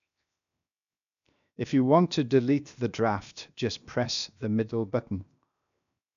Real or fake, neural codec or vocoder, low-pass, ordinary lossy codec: fake; codec, 16 kHz, 0.7 kbps, FocalCodec; 7.2 kHz; none